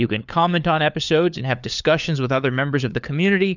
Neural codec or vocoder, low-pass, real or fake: codec, 16 kHz, 4 kbps, FunCodec, trained on LibriTTS, 50 frames a second; 7.2 kHz; fake